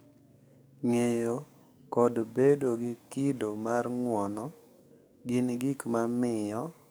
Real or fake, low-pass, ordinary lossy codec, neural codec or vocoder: fake; none; none; codec, 44.1 kHz, 7.8 kbps, DAC